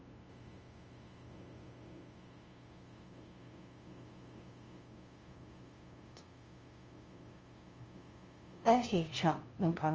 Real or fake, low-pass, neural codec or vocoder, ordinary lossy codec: fake; 7.2 kHz; codec, 16 kHz, 0.5 kbps, FunCodec, trained on LibriTTS, 25 frames a second; Opus, 24 kbps